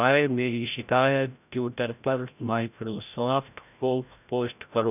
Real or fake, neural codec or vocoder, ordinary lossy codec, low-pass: fake; codec, 16 kHz, 0.5 kbps, FreqCodec, larger model; none; 3.6 kHz